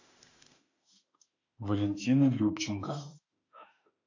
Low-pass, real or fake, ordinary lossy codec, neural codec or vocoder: 7.2 kHz; fake; AAC, 48 kbps; autoencoder, 48 kHz, 32 numbers a frame, DAC-VAE, trained on Japanese speech